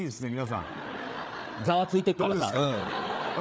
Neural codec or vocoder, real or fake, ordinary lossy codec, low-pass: codec, 16 kHz, 8 kbps, FreqCodec, larger model; fake; none; none